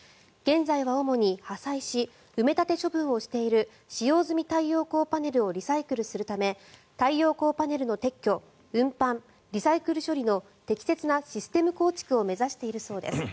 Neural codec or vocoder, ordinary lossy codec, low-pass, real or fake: none; none; none; real